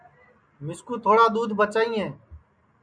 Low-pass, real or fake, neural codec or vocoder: 9.9 kHz; real; none